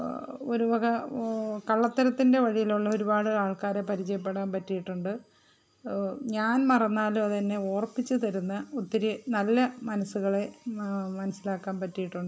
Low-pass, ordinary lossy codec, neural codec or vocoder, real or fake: none; none; none; real